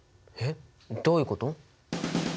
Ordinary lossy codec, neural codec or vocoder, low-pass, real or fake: none; none; none; real